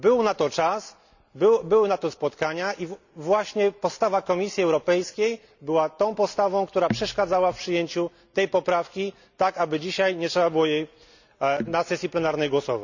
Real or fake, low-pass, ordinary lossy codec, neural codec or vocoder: real; 7.2 kHz; none; none